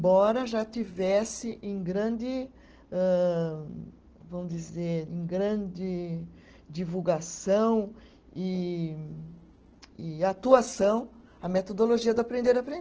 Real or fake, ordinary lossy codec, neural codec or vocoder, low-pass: real; Opus, 16 kbps; none; 7.2 kHz